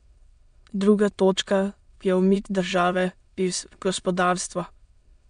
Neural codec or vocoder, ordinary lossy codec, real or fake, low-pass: autoencoder, 22.05 kHz, a latent of 192 numbers a frame, VITS, trained on many speakers; MP3, 64 kbps; fake; 9.9 kHz